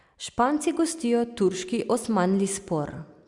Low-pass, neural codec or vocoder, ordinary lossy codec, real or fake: 10.8 kHz; none; Opus, 64 kbps; real